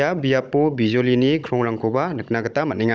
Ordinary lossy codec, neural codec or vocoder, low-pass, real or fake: none; codec, 16 kHz, 16 kbps, FunCodec, trained on Chinese and English, 50 frames a second; none; fake